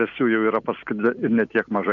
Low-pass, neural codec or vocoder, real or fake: 7.2 kHz; none; real